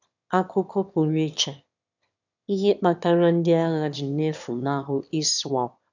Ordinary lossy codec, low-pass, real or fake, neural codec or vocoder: none; 7.2 kHz; fake; autoencoder, 22.05 kHz, a latent of 192 numbers a frame, VITS, trained on one speaker